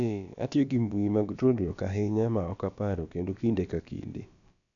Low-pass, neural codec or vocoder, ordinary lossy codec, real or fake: 7.2 kHz; codec, 16 kHz, about 1 kbps, DyCAST, with the encoder's durations; none; fake